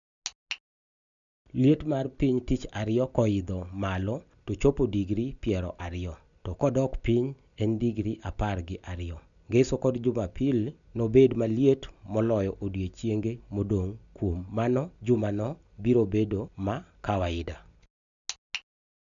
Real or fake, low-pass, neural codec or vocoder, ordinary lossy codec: real; 7.2 kHz; none; none